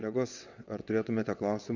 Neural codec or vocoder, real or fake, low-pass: vocoder, 22.05 kHz, 80 mel bands, WaveNeXt; fake; 7.2 kHz